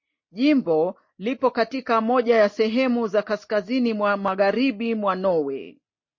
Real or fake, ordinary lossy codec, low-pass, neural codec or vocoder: real; MP3, 32 kbps; 7.2 kHz; none